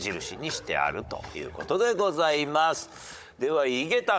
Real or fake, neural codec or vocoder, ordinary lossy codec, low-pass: fake; codec, 16 kHz, 16 kbps, FunCodec, trained on Chinese and English, 50 frames a second; none; none